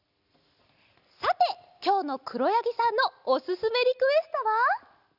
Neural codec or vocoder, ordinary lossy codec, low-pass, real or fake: none; none; 5.4 kHz; real